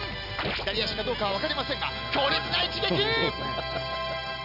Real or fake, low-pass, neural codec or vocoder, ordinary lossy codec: real; 5.4 kHz; none; none